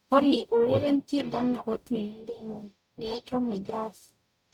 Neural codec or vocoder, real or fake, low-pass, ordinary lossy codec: codec, 44.1 kHz, 0.9 kbps, DAC; fake; 19.8 kHz; none